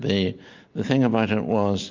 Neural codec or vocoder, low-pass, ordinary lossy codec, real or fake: none; 7.2 kHz; MP3, 48 kbps; real